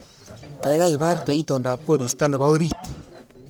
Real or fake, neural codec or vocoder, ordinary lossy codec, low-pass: fake; codec, 44.1 kHz, 1.7 kbps, Pupu-Codec; none; none